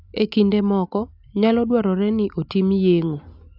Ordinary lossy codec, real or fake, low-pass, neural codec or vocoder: AAC, 48 kbps; real; 5.4 kHz; none